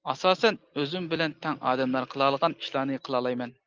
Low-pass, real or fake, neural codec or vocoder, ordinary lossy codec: 7.2 kHz; real; none; Opus, 32 kbps